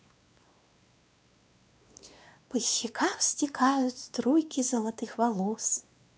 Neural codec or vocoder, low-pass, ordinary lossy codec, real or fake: codec, 16 kHz, 2 kbps, X-Codec, WavLM features, trained on Multilingual LibriSpeech; none; none; fake